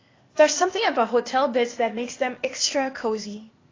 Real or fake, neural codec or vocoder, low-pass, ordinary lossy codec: fake; codec, 16 kHz, 0.8 kbps, ZipCodec; 7.2 kHz; AAC, 32 kbps